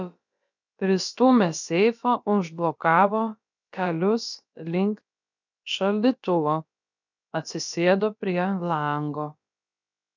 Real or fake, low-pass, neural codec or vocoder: fake; 7.2 kHz; codec, 16 kHz, about 1 kbps, DyCAST, with the encoder's durations